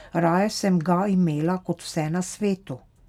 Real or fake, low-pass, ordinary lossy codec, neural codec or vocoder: real; 19.8 kHz; none; none